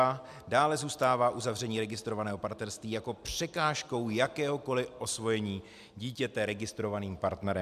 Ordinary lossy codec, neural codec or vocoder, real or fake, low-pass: AAC, 96 kbps; none; real; 14.4 kHz